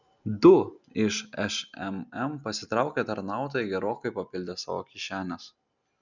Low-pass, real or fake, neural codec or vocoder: 7.2 kHz; real; none